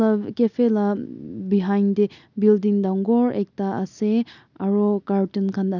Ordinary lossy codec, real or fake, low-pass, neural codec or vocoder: none; real; 7.2 kHz; none